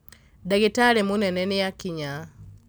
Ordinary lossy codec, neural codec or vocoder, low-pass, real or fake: none; none; none; real